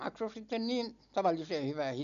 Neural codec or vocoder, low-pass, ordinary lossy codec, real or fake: none; 7.2 kHz; none; real